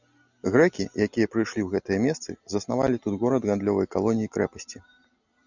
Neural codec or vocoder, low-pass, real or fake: none; 7.2 kHz; real